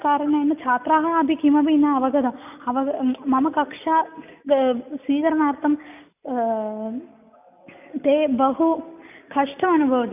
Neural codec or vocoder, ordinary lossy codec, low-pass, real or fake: none; none; 3.6 kHz; real